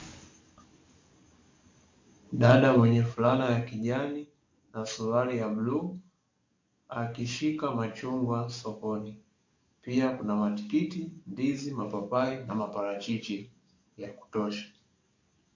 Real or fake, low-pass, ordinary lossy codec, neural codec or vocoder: fake; 7.2 kHz; MP3, 48 kbps; codec, 44.1 kHz, 7.8 kbps, DAC